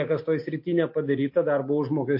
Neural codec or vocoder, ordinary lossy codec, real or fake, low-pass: none; MP3, 32 kbps; real; 5.4 kHz